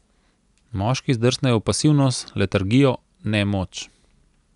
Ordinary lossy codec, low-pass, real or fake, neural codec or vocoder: none; 10.8 kHz; real; none